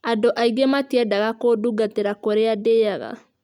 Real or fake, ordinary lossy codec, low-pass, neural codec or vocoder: real; none; 19.8 kHz; none